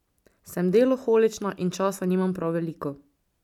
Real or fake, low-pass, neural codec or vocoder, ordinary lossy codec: real; 19.8 kHz; none; none